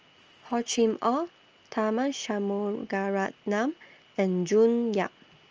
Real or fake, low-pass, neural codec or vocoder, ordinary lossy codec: real; 7.2 kHz; none; Opus, 24 kbps